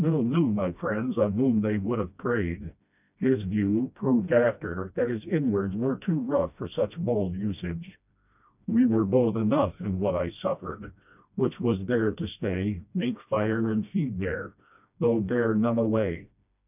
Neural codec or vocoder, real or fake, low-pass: codec, 16 kHz, 1 kbps, FreqCodec, smaller model; fake; 3.6 kHz